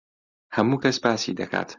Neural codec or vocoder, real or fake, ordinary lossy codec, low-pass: none; real; Opus, 64 kbps; 7.2 kHz